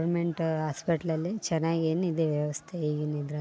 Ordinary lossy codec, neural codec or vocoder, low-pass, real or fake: none; none; none; real